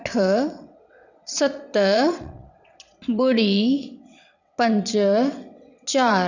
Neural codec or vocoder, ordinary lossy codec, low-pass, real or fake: vocoder, 22.05 kHz, 80 mel bands, WaveNeXt; none; 7.2 kHz; fake